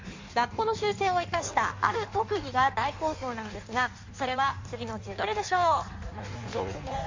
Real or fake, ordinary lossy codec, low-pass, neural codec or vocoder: fake; MP3, 48 kbps; 7.2 kHz; codec, 16 kHz in and 24 kHz out, 1.1 kbps, FireRedTTS-2 codec